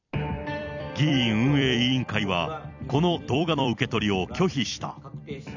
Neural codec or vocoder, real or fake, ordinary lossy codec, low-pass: vocoder, 44.1 kHz, 128 mel bands every 256 samples, BigVGAN v2; fake; none; 7.2 kHz